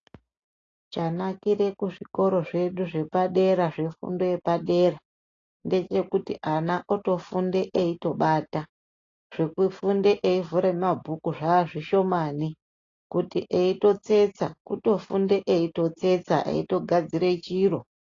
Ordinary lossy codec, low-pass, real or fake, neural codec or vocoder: AAC, 32 kbps; 7.2 kHz; real; none